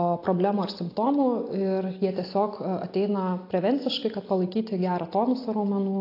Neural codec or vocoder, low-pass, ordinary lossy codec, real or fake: none; 5.4 kHz; AAC, 32 kbps; real